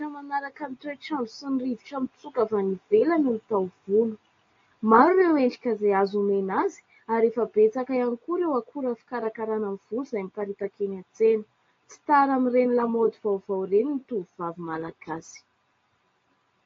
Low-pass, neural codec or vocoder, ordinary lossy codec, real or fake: 7.2 kHz; none; AAC, 32 kbps; real